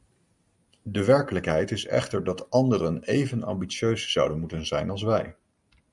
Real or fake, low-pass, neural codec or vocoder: real; 10.8 kHz; none